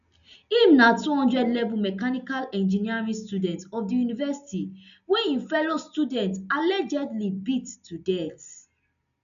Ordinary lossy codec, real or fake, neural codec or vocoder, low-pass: none; real; none; 7.2 kHz